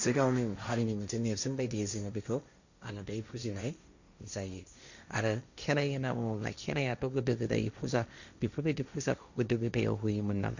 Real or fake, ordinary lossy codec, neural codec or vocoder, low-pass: fake; none; codec, 16 kHz, 1.1 kbps, Voila-Tokenizer; 7.2 kHz